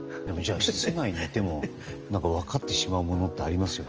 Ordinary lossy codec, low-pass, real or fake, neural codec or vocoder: Opus, 24 kbps; 7.2 kHz; real; none